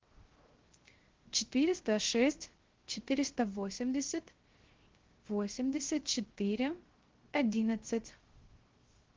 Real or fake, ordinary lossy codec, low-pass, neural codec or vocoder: fake; Opus, 16 kbps; 7.2 kHz; codec, 16 kHz, 0.3 kbps, FocalCodec